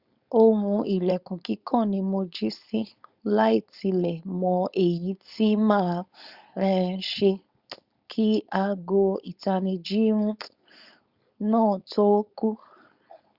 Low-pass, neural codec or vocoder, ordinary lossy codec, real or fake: 5.4 kHz; codec, 16 kHz, 4.8 kbps, FACodec; Opus, 64 kbps; fake